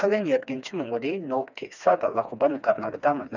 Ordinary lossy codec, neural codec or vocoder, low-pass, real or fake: none; codec, 16 kHz, 2 kbps, FreqCodec, smaller model; 7.2 kHz; fake